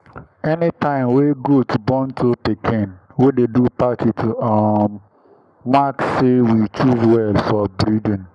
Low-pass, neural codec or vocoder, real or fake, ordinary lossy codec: 10.8 kHz; codec, 44.1 kHz, 7.8 kbps, Pupu-Codec; fake; none